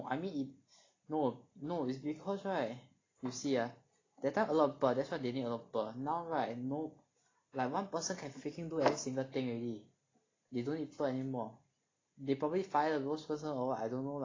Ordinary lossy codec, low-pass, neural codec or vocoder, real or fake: AAC, 32 kbps; 7.2 kHz; none; real